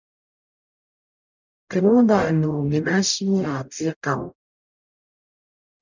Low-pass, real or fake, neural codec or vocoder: 7.2 kHz; fake; codec, 44.1 kHz, 0.9 kbps, DAC